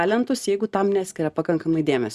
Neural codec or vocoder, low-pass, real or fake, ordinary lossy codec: none; 14.4 kHz; real; Opus, 64 kbps